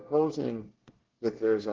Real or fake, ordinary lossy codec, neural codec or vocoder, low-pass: fake; Opus, 16 kbps; codec, 24 kHz, 1 kbps, SNAC; 7.2 kHz